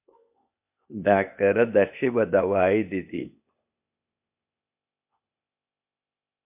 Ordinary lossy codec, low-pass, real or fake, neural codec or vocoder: MP3, 32 kbps; 3.6 kHz; fake; codec, 16 kHz, 0.8 kbps, ZipCodec